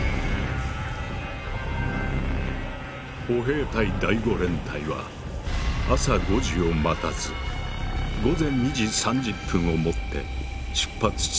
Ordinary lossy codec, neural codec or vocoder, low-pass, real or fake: none; none; none; real